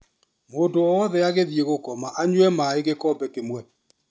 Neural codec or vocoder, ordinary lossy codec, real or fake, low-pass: none; none; real; none